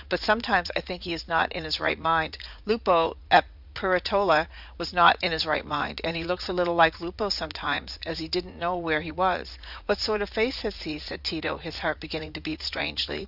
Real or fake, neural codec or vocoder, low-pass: fake; vocoder, 44.1 kHz, 80 mel bands, Vocos; 5.4 kHz